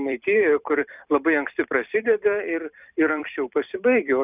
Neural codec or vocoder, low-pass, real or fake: vocoder, 44.1 kHz, 128 mel bands every 256 samples, BigVGAN v2; 3.6 kHz; fake